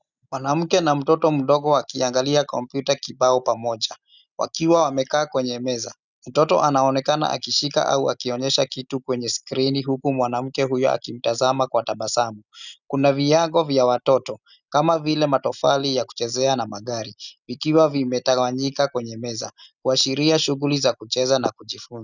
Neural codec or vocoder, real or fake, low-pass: none; real; 7.2 kHz